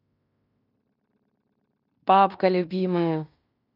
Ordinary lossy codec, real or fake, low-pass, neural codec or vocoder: none; fake; 5.4 kHz; codec, 16 kHz in and 24 kHz out, 0.9 kbps, LongCat-Audio-Codec, fine tuned four codebook decoder